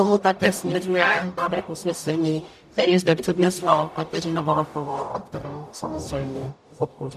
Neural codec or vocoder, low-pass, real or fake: codec, 44.1 kHz, 0.9 kbps, DAC; 14.4 kHz; fake